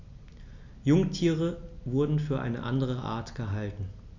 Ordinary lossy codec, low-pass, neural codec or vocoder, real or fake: none; 7.2 kHz; none; real